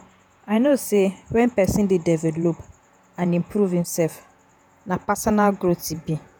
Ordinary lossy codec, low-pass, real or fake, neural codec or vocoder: none; none; fake; vocoder, 48 kHz, 128 mel bands, Vocos